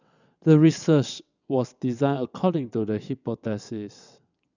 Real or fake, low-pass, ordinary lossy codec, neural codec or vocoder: fake; 7.2 kHz; none; vocoder, 22.05 kHz, 80 mel bands, WaveNeXt